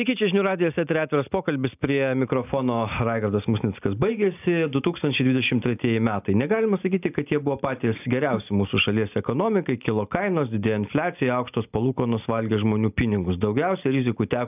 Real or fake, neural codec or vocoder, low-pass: real; none; 3.6 kHz